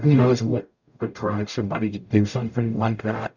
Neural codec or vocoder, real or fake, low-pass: codec, 44.1 kHz, 0.9 kbps, DAC; fake; 7.2 kHz